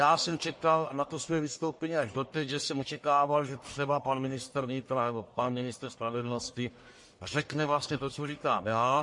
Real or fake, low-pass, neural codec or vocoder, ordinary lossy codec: fake; 10.8 kHz; codec, 44.1 kHz, 1.7 kbps, Pupu-Codec; MP3, 48 kbps